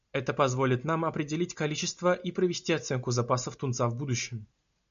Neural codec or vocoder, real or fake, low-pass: none; real; 7.2 kHz